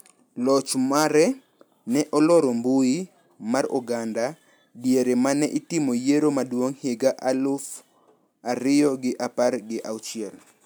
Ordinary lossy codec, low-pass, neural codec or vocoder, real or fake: none; none; none; real